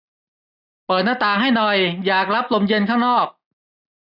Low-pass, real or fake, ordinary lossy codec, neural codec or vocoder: 5.4 kHz; real; none; none